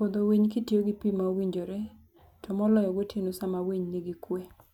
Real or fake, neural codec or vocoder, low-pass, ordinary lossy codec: real; none; 19.8 kHz; none